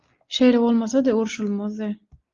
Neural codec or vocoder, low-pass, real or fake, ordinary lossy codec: none; 7.2 kHz; real; Opus, 32 kbps